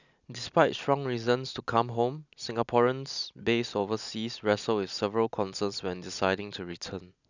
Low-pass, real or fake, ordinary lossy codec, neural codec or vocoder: 7.2 kHz; real; none; none